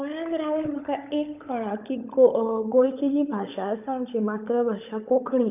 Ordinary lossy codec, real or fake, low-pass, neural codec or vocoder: none; fake; 3.6 kHz; codec, 16 kHz, 16 kbps, FunCodec, trained on LibriTTS, 50 frames a second